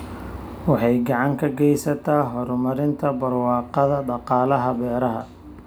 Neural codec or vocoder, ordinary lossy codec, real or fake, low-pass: none; none; real; none